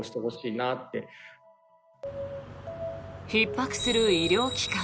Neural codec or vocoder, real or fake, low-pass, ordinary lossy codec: none; real; none; none